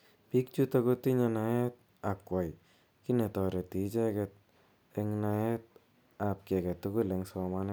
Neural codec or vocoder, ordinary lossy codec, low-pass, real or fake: none; none; none; real